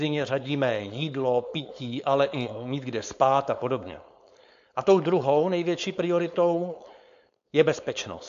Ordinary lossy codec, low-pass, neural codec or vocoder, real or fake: AAC, 64 kbps; 7.2 kHz; codec, 16 kHz, 4.8 kbps, FACodec; fake